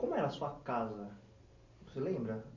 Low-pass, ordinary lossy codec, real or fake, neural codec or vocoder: 7.2 kHz; MP3, 48 kbps; real; none